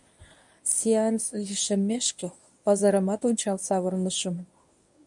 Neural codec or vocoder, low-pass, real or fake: codec, 24 kHz, 0.9 kbps, WavTokenizer, medium speech release version 1; 10.8 kHz; fake